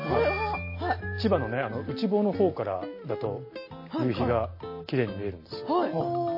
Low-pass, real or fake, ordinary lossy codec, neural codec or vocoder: 5.4 kHz; real; MP3, 24 kbps; none